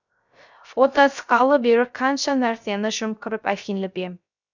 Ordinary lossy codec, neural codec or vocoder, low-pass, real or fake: none; codec, 16 kHz, 0.3 kbps, FocalCodec; 7.2 kHz; fake